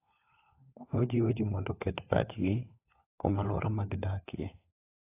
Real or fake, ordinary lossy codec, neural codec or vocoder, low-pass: fake; AAC, 24 kbps; codec, 16 kHz, 4 kbps, FunCodec, trained on LibriTTS, 50 frames a second; 3.6 kHz